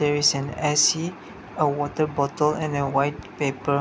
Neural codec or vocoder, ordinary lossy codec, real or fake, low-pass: none; none; real; none